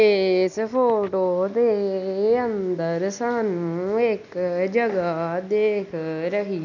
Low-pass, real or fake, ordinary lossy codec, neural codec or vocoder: 7.2 kHz; real; none; none